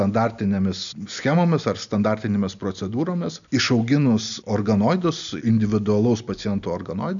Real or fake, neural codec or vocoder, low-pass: real; none; 7.2 kHz